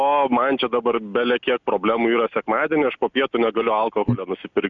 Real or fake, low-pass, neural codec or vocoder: real; 7.2 kHz; none